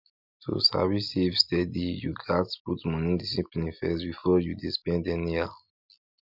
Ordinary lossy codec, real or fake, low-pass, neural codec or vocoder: none; real; 5.4 kHz; none